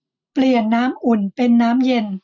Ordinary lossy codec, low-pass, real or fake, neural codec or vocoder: none; 7.2 kHz; real; none